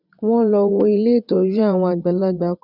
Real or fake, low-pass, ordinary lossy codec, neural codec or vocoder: fake; 5.4 kHz; none; vocoder, 22.05 kHz, 80 mel bands, WaveNeXt